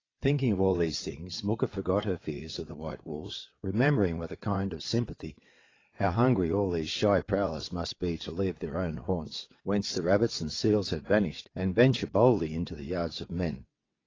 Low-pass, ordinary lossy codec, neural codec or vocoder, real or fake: 7.2 kHz; AAC, 32 kbps; vocoder, 22.05 kHz, 80 mel bands, WaveNeXt; fake